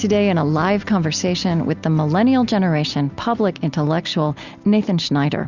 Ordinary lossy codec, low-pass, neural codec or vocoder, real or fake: Opus, 64 kbps; 7.2 kHz; none; real